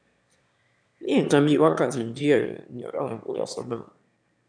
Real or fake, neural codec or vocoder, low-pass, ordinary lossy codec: fake; autoencoder, 22.05 kHz, a latent of 192 numbers a frame, VITS, trained on one speaker; 9.9 kHz; none